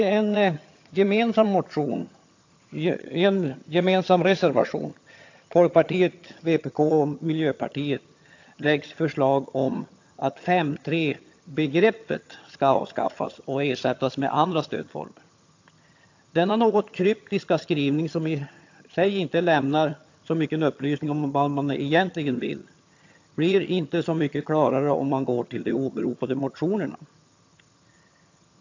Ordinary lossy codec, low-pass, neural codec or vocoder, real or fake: AAC, 48 kbps; 7.2 kHz; vocoder, 22.05 kHz, 80 mel bands, HiFi-GAN; fake